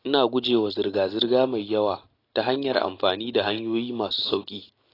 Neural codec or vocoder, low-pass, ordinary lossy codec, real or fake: none; 5.4 kHz; AAC, 24 kbps; real